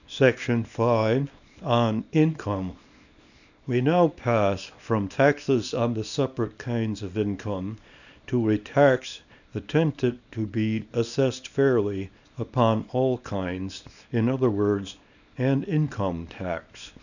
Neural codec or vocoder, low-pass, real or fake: codec, 24 kHz, 0.9 kbps, WavTokenizer, small release; 7.2 kHz; fake